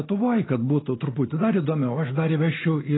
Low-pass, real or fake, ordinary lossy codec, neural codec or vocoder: 7.2 kHz; real; AAC, 16 kbps; none